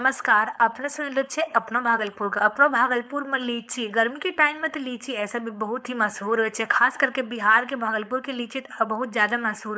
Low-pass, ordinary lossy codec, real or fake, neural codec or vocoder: none; none; fake; codec, 16 kHz, 4.8 kbps, FACodec